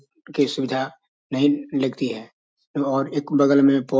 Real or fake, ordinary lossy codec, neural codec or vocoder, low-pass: real; none; none; none